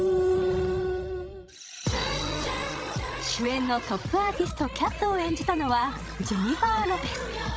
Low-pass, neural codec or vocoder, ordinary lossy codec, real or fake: none; codec, 16 kHz, 16 kbps, FreqCodec, larger model; none; fake